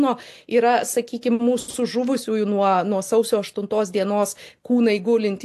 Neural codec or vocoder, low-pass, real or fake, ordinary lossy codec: none; 14.4 kHz; real; AAC, 64 kbps